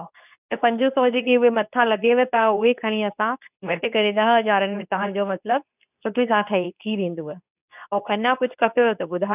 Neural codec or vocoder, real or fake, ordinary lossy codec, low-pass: codec, 24 kHz, 0.9 kbps, WavTokenizer, medium speech release version 2; fake; none; 3.6 kHz